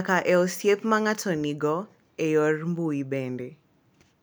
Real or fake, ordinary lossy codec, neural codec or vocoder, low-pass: real; none; none; none